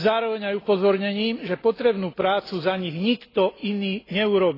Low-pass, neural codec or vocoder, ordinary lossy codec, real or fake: 5.4 kHz; none; AAC, 24 kbps; real